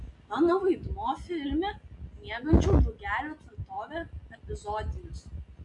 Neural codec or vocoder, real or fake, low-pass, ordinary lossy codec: none; real; 10.8 kHz; AAC, 64 kbps